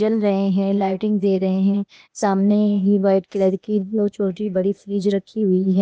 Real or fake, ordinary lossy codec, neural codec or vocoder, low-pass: fake; none; codec, 16 kHz, 0.8 kbps, ZipCodec; none